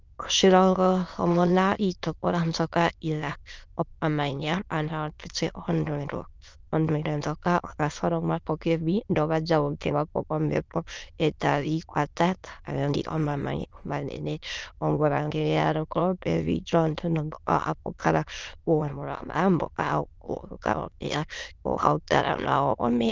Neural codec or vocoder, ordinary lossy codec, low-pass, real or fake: autoencoder, 22.05 kHz, a latent of 192 numbers a frame, VITS, trained on many speakers; Opus, 24 kbps; 7.2 kHz; fake